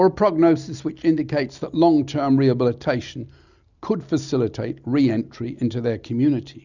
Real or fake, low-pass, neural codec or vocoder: real; 7.2 kHz; none